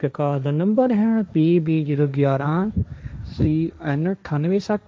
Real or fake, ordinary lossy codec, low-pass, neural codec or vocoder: fake; none; none; codec, 16 kHz, 1.1 kbps, Voila-Tokenizer